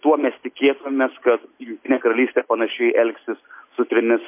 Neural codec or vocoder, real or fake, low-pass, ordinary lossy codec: none; real; 3.6 kHz; MP3, 24 kbps